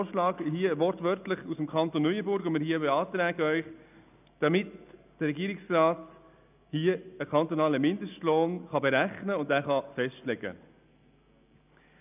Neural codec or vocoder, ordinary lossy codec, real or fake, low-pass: none; none; real; 3.6 kHz